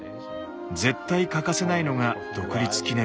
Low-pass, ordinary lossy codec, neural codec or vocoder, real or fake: none; none; none; real